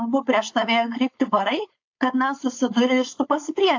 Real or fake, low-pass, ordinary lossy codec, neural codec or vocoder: fake; 7.2 kHz; AAC, 48 kbps; codec, 16 kHz, 4.8 kbps, FACodec